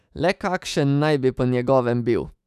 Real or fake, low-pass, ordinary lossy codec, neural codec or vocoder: fake; 14.4 kHz; none; autoencoder, 48 kHz, 128 numbers a frame, DAC-VAE, trained on Japanese speech